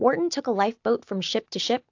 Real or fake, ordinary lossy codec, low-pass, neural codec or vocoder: real; AAC, 48 kbps; 7.2 kHz; none